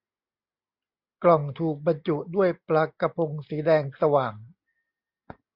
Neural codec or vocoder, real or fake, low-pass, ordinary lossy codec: none; real; 5.4 kHz; Opus, 64 kbps